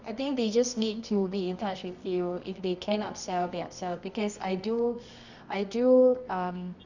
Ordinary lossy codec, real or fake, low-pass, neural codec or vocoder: none; fake; 7.2 kHz; codec, 24 kHz, 0.9 kbps, WavTokenizer, medium music audio release